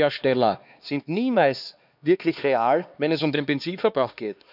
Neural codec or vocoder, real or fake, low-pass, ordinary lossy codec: codec, 16 kHz, 2 kbps, X-Codec, HuBERT features, trained on LibriSpeech; fake; 5.4 kHz; none